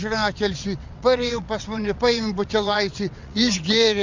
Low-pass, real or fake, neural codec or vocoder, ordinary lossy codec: 7.2 kHz; fake; vocoder, 22.05 kHz, 80 mel bands, WaveNeXt; MP3, 64 kbps